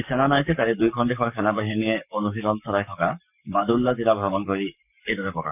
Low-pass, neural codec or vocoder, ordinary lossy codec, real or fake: 3.6 kHz; codec, 44.1 kHz, 7.8 kbps, Pupu-Codec; none; fake